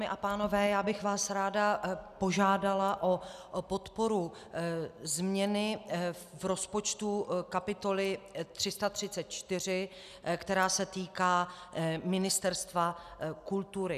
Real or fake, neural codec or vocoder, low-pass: real; none; 14.4 kHz